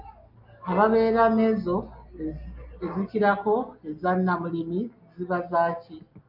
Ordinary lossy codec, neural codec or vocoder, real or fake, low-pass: MP3, 48 kbps; none; real; 5.4 kHz